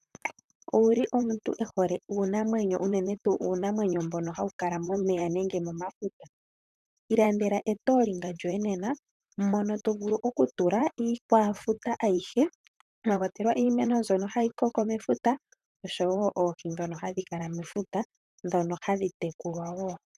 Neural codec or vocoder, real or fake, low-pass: vocoder, 44.1 kHz, 128 mel bands, Pupu-Vocoder; fake; 14.4 kHz